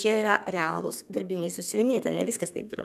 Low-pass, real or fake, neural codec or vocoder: 14.4 kHz; fake; codec, 32 kHz, 1.9 kbps, SNAC